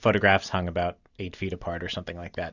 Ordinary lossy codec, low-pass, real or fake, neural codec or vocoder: Opus, 64 kbps; 7.2 kHz; real; none